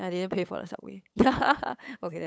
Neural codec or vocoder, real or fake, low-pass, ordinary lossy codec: codec, 16 kHz, 8 kbps, FunCodec, trained on LibriTTS, 25 frames a second; fake; none; none